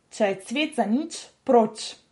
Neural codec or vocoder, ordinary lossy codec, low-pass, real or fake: none; MP3, 48 kbps; 19.8 kHz; real